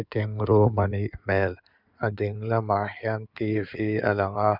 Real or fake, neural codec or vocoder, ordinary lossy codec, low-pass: fake; codec, 16 kHz in and 24 kHz out, 2.2 kbps, FireRedTTS-2 codec; none; 5.4 kHz